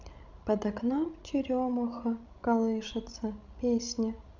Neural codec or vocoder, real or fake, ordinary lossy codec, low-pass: codec, 16 kHz, 16 kbps, FreqCodec, larger model; fake; none; 7.2 kHz